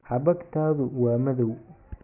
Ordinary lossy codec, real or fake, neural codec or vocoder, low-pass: none; real; none; 3.6 kHz